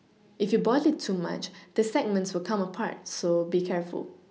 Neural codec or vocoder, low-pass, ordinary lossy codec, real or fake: none; none; none; real